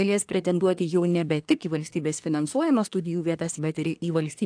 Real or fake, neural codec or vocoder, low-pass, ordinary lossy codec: fake; codec, 24 kHz, 1 kbps, SNAC; 9.9 kHz; AAC, 64 kbps